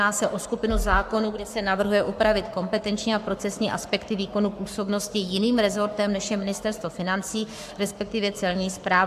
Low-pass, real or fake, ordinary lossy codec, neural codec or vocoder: 14.4 kHz; fake; AAC, 96 kbps; codec, 44.1 kHz, 7.8 kbps, Pupu-Codec